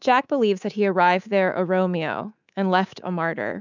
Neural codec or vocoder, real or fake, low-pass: codec, 24 kHz, 3.1 kbps, DualCodec; fake; 7.2 kHz